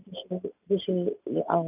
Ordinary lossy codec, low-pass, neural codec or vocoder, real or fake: none; 3.6 kHz; none; real